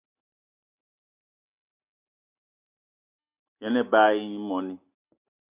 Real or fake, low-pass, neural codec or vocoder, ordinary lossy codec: real; 3.6 kHz; none; Opus, 64 kbps